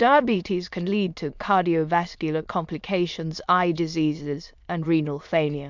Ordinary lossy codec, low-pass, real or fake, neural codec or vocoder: MP3, 64 kbps; 7.2 kHz; fake; autoencoder, 22.05 kHz, a latent of 192 numbers a frame, VITS, trained on many speakers